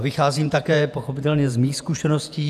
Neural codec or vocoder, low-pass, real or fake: vocoder, 48 kHz, 128 mel bands, Vocos; 14.4 kHz; fake